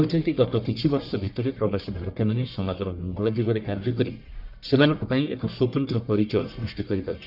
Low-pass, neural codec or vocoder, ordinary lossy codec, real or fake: 5.4 kHz; codec, 44.1 kHz, 1.7 kbps, Pupu-Codec; none; fake